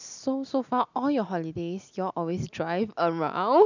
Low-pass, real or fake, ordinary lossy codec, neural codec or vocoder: 7.2 kHz; real; none; none